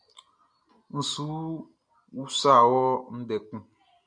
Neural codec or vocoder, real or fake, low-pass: none; real; 9.9 kHz